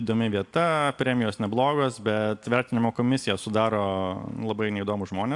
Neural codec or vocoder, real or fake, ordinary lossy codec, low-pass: none; real; AAC, 64 kbps; 10.8 kHz